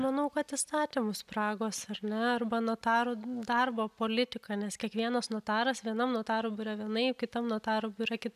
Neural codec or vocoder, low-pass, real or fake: none; 14.4 kHz; real